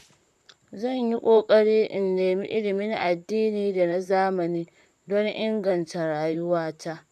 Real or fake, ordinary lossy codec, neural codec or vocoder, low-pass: fake; none; vocoder, 44.1 kHz, 128 mel bands, Pupu-Vocoder; 14.4 kHz